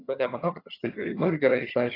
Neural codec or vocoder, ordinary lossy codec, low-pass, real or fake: vocoder, 22.05 kHz, 80 mel bands, HiFi-GAN; AAC, 24 kbps; 5.4 kHz; fake